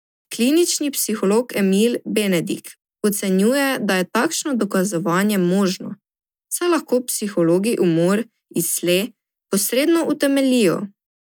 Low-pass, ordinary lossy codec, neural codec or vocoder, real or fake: none; none; none; real